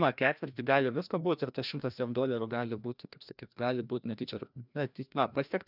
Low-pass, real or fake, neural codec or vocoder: 5.4 kHz; fake; codec, 16 kHz, 1 kbps, FreqCodec, larger model